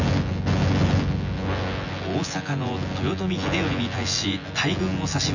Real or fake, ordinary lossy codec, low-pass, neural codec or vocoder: fake; none; 7.2 kHz; vocoder, 24 kHz, 100 mel bands, Vocos